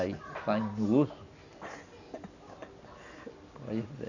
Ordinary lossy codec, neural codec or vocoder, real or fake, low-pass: none; none; real; 7.2 kHz